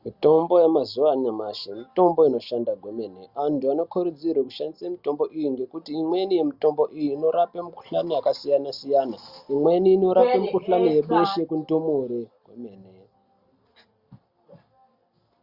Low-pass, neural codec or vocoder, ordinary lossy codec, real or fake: 5.4 kHz; none; Opus, 64 kbps; real